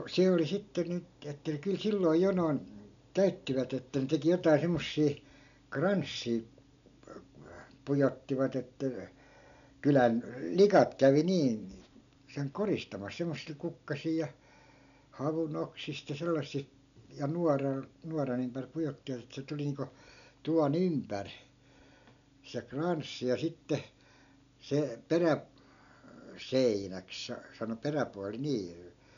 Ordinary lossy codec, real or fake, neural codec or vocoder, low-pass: none; real; none; 7.2 kHz